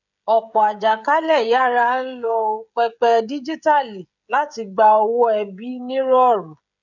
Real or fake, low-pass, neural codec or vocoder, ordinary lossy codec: fake; 7.2 kHz; codec, 16 kHz, 16 kbps, FreqCodec, smaller model; none